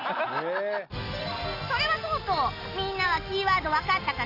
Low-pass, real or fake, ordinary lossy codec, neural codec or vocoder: 5.4 kHz; real; AAC, 32 kbps; none